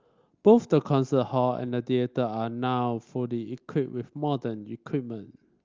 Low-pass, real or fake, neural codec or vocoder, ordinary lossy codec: 7.2 kHz; real; none; Opus, 32 kbps